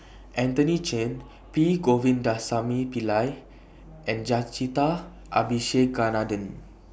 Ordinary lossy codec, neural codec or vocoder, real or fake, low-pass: none; none; real; none